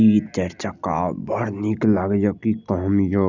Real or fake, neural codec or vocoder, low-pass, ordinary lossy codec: real; none; 7.2 kHz; none